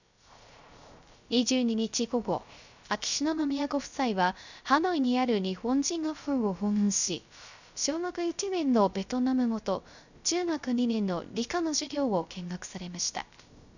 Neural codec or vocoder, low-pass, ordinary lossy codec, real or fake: codec, 16 kHz, 0.3 kbps, FocalCodec; 7.2 kHz; none; fake